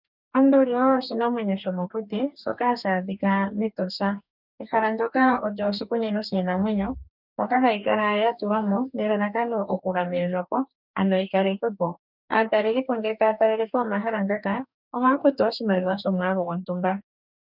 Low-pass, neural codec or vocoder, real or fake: 5.4 kHz; codec, 44.1 kHz, 2.6 kbps, DAC; fake